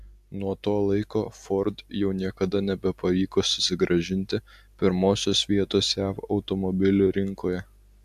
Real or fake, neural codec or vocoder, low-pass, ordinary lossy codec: real; none; 14.4 kHz; AAC, 96 kbps